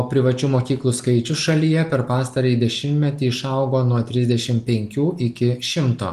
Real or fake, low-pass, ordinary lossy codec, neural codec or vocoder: real; 14.4 kHz; Opus, 24 kbps; none